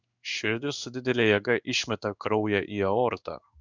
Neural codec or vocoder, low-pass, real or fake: codec, 16 kHz in and 24 kHz out, 1 kbps, XY-Tokenizer; 7.2 kHz; fake